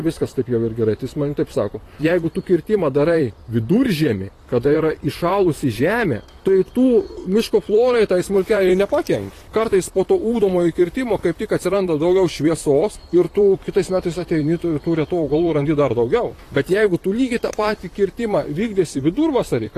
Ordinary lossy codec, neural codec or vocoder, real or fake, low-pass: AAC, 48 kbps; vocoder, 44.1 kHz, 128 mel bands, Pupu-Vocoder; fake; 14.4 kHz